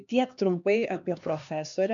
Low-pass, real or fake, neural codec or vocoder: 7.2 kHz; fake; codec, 16 kHz, 2 kbps, X-Codec, HuBERT features, trained on LibriSpeech